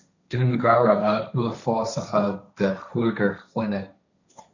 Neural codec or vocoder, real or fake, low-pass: codec, 16 kHz, 1.1 kbps, Voila-Tokenizer; fake; 7.2 kHz